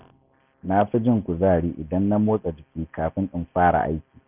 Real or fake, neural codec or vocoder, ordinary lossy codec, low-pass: real; none; none; 3.6 kHz